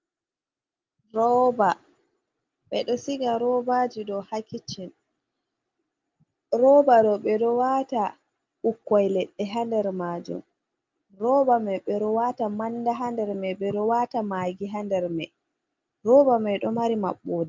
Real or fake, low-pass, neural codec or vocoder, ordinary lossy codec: real; 7.2 kHz; none; Opus, 24 kbps